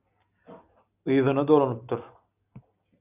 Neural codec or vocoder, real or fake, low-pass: none; real; 3.6 kHz